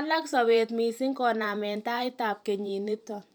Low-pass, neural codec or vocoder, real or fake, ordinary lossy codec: none; vocoder, 44.1 kHz, 128 mel bands every 512 samples, BigVGAN v2; fake; none